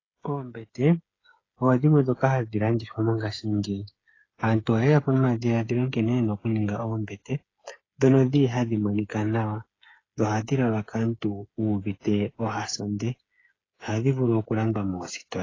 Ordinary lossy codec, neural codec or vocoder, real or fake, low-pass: AAC, 32 kbps; codec, 16 kHz, 8 kbps, FreqCodec, smaller model; fake; 7.2 kHz